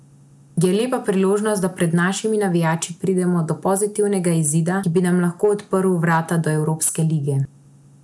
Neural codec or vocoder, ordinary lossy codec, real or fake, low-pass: none; none; real; none